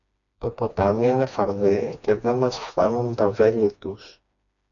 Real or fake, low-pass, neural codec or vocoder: fake; 7.2 kHz; codec, 16 kHz, 2 kbps, FreqCodec, smaller model